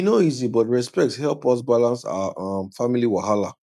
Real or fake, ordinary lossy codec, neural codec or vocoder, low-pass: real; none; none; 14.4 kHz